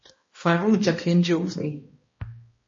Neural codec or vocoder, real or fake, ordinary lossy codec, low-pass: codec, 16 kHz, 1 kbps, X-Codec, HuBERT features, trained on balanced general audio; fake; MP3, 32 kbps; 7.2 kHz